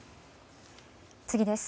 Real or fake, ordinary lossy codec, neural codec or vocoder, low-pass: real; none; none; none